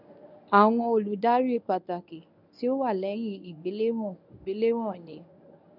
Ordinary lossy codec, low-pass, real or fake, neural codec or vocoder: none; 5.4 kHz; fake; codec, 24 kHz, 0.9 kbps, WavTokenizer, medium speech release version 1